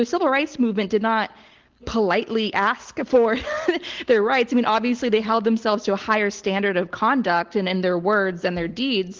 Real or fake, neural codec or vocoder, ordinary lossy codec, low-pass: real; none; Opus, 16 kbps; 7.2 kHz